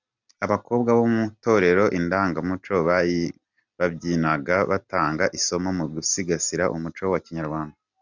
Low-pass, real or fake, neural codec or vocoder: 7.2 kHz; real; none